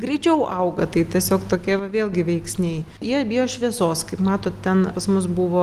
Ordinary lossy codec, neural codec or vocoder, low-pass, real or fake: Opus, 24 kbps; none; 14.4 kHz; real